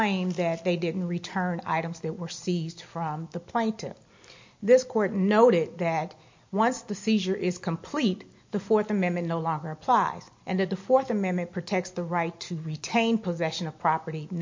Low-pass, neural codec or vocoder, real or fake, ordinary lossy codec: 7.2 kHz; none; real; MP3, 48 kbps